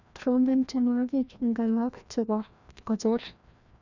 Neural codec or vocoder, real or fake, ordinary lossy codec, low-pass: codec, 16 kHz, 1 kbps, FreqCodec, larger model; fake; none; 7.2 kHz